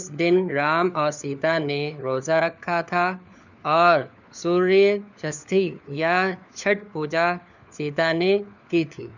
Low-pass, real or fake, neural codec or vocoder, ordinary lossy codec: 7.2 kHz; fake; codec, 16 kHz, 4 kbps, FunCodec, trained on LibriTTS, 50 frames a second; none